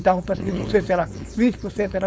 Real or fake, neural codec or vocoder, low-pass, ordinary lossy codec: fake; codec, 16 kHz, 4.8 kbps, FACodec; none; none